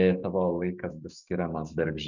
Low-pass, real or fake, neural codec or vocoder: 7.2 kHz; fake; autoencoder, 48 kHz, 128 numbers a frame, DAC-VAE, trained on Japanese speech